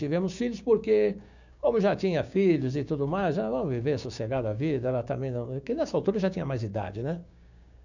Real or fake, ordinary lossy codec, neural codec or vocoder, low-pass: fake; none; codec, 16 kHz, 6 kbps, DAC; 7.2 kHz